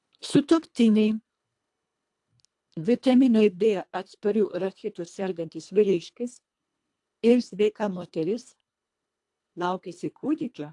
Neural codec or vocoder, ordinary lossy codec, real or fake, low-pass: codec, 24 kHz, 1.5 kbps, HILCodec; AAC, 64 kbps; fake; 10.8 kHz